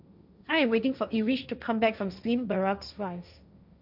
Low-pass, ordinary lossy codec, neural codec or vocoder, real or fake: 5.4 kHz; none; codec, 16 kHz, 1.1 kbps, Voila-Tokenizer; fake